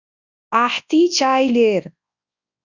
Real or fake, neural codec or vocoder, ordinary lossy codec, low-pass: fake; codec, 24 kHz, 0.9 kbps, WavTokenizer, large speech release; Opus, 64 kbps; 7.2 kHz